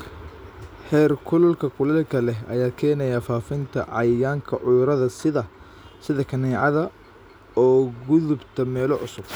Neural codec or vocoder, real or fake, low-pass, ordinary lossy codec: none; real; none; none